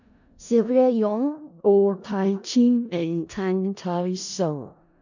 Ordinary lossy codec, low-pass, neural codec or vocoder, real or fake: none; 7.2 kHz; codec, 16 kHz in and 24 kHz out, 0.4 kbps, LongCat-Audio-Codec, four codebook decoder; fake